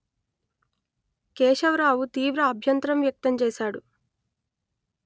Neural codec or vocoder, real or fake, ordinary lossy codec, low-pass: none; real; none; none